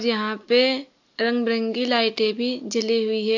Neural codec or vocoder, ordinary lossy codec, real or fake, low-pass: none; AAC, 48 kbps; real; 7.2 kHz